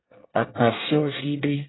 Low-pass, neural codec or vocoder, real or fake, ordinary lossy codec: 7.2 kHz; codec, 24 kHz, 1 kbps, SNAC; fake; AAC, 16 kbps